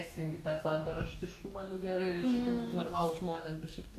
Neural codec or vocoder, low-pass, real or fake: codec, 44.1 kHz, 2.6 kbps, DAC; 14.4 kHz; fake